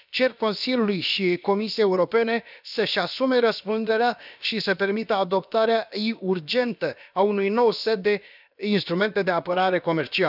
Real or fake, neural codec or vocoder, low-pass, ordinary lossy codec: fake; codec, 16 kHz, about 1 kbps, DyCAST, with the encoder's durations; 5.4 kHz; none